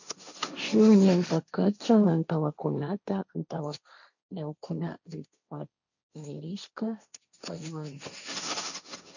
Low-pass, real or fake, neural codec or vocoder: 7.2 kHz; fake; codec, 16 kHz, 1.1 kbps, Voila-Tokenizer